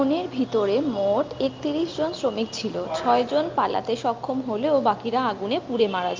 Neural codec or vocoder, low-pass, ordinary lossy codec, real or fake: none; 7.2 kHz; Opus, 24 kbps; real